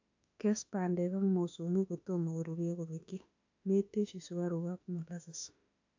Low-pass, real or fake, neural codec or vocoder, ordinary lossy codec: 7.2 kHz; fake; autoencoder, 48 kHz, 32 numbers a frame, DAC-VAE, trained on Japanese speech; AAC, 48 kbps